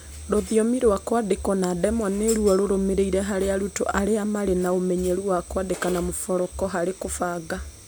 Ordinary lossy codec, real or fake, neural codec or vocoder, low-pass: none; real; none; none